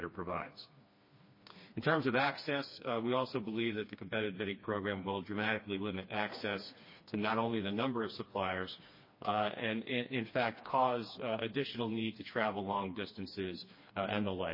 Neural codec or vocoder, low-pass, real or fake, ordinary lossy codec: codec, 16 kHz, 2 kbps, FreqCodec, smaller model; 5.4 kHz; fake; MP3, 24 kbps